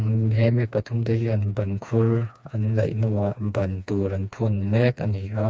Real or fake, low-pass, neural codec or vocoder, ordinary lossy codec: fake; none; codec, 16 kHz, 2 kbps, FreqCodec, smaller model; none